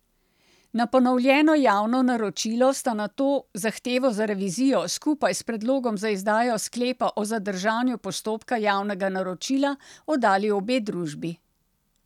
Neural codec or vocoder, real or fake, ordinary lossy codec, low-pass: none; real; none; 19.8 kHz